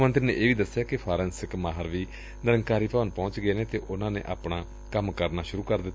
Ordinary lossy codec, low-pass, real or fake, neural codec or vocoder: none; none; real; none